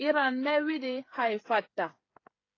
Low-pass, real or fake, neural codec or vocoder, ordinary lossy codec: 7.2 kHz; fake; codec, 16 kHz, 8 kbps, FreqCodec, smaller model; AAC, 32 kbps